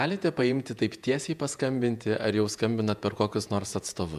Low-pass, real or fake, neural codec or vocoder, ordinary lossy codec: 14.4 kHz; fake; vocoder, 48 kHz, 128 mel bands, Vocos; MP3, 96 kbps